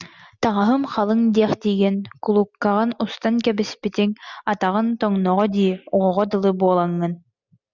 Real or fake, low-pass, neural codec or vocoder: real; 7.2 kHz; none